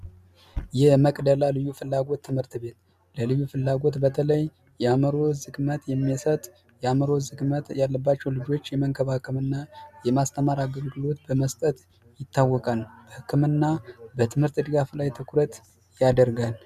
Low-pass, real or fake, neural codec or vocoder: 14.4 kHz; real; none